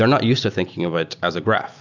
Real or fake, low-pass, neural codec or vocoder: real; 7.2 kHz; none